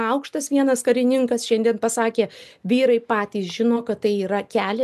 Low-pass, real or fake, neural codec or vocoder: 14.4 kHz; real; none